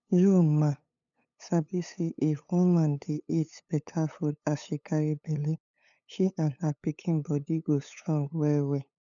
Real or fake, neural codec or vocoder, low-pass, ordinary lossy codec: fake; codec, 16 kHz, 8 kbps, FunCodec, trained on LibriTTS, 25 frames a second; 7.2 kHz; none